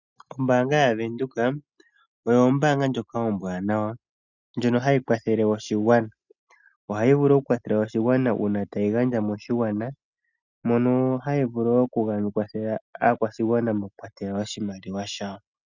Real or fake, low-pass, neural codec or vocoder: real; 7.2 kHz; none